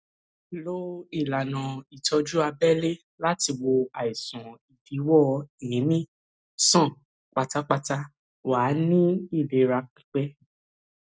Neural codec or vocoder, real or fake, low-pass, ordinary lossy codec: none; real; none; none